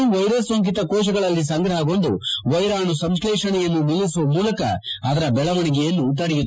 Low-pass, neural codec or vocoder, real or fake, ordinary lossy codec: none; none; real; none